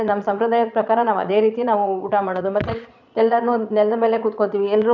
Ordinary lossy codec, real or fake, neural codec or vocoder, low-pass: none; fake; vocoder, 22.05 kHz, 80 mel bands, Vocos; 7.2 kHz